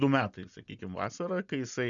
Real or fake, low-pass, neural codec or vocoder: real; 7.2 kHz; none